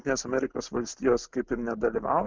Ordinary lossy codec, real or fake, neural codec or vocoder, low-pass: Opus, 24 kbps; real; none; 7.2 kHz